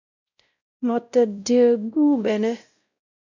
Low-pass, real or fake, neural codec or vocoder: 7.2 kHz; fake; codec, 16 kHz, 0.5 kbps, X-Codec, WavLM features, trained on Multilingual LibriSpeech